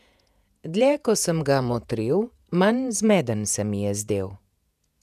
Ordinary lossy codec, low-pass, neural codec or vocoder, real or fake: none; 14.4 kHz; vocoder, 48 kHz, 128 mel bands, Vocos; fake